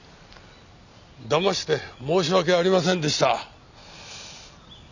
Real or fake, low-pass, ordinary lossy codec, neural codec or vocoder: real; 7.2 kHz; none; none